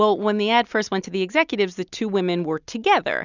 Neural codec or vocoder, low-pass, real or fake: none; 7.2 kHz; real